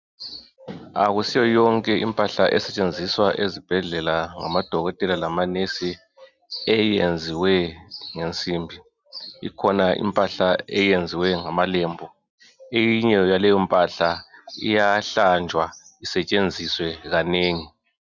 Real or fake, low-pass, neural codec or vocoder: real; 7.2 kHz; none